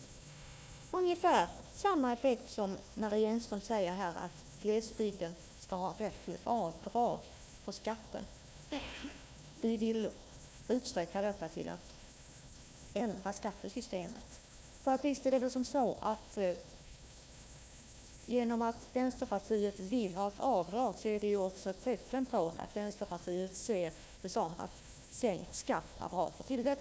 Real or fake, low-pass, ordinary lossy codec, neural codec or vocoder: fake; none; none; codec, 16 kHz, 1 kbps, FunCodec, trained on Chinese and English, 50 frames a second